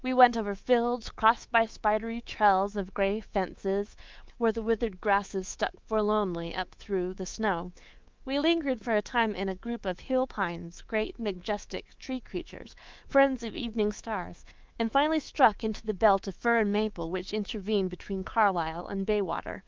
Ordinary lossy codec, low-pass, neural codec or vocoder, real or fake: Opus, 32 kbps; 7.2 kHz; codec, 24 kHz, 3.1 kbps, DualCodec; fake